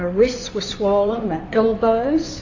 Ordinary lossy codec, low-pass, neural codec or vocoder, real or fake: AAC, 48 kbps; 7.2 kHz; codec, 16 kHz in and 24 kHz out, 2.2 kbps, FireRedTTS-2 codec; fake